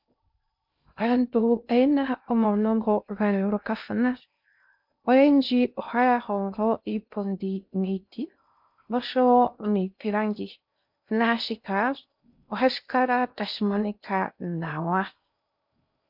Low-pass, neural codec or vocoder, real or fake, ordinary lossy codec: 5.4 kHz; codec, 16 kHz in and 24 kHz out, 0.6 kbps, FocalCodec, streaming, 2048 codes; fake; MP3, 48 kbps